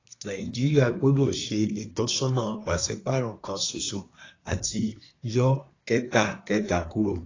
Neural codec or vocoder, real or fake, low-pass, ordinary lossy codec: codec, 24 kHz, 1 kbps, SNAC; fake; 7.2 kHz; AAC, 32 kbps